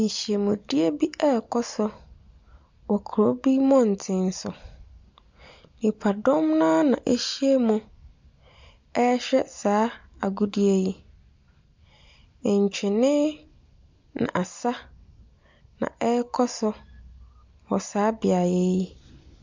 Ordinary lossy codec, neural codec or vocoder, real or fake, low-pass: MP3, 64 kbps; none; real; 7.2 kHz